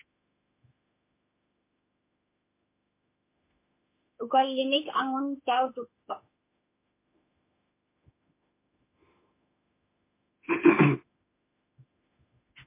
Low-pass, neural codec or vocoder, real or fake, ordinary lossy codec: 3.6 kHz; autoencoder, 48 kHz, 32 numbers a frame, DAC-VAE, trained on Japanese speech; fake; MP3, 24 kbps